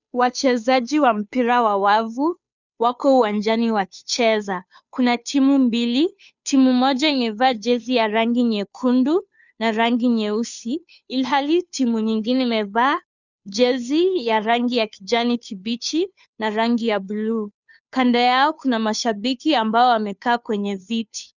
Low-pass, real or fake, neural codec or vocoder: 7.2 kHz; fake; codec, 16 kHz, 2 kbps, FunCodec, trained on Chinese and English, 25 frames a second